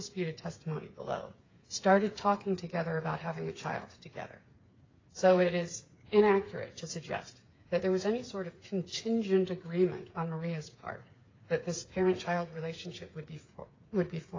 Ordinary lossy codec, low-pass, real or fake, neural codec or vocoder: AAC, 32 kbps; 7.2 kHz; fake; codec, 16 kHz, 8 kbps, FreqCodec, smaller model